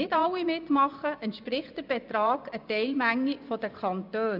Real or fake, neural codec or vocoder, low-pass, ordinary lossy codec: fake; vocoder, 24 kHz, 100 mel bands, Vocos; 5.4 kHz; none